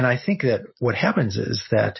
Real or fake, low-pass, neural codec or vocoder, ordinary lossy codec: real; 7.2 kHz; none; MP3, 24 kbps